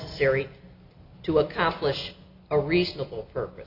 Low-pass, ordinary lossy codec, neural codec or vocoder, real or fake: 5.4 kHz; AAC, 48 kbps; none; real